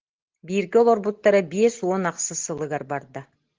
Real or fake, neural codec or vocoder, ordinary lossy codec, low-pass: real; none; Opus, 16 kbps; 7.2 kHz